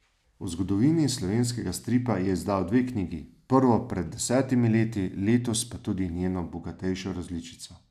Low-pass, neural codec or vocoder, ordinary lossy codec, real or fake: 14.4 kHz; none; none; real